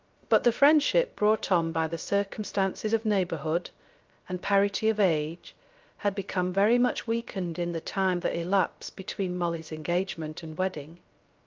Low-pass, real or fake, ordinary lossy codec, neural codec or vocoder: 7.2 kHz; fake; Opus, 32 kbps; codec, 16 kHz, 0.3 kbps, FocalCodec